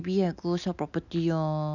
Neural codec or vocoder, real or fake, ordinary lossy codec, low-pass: none; real; none; 7.2 kHz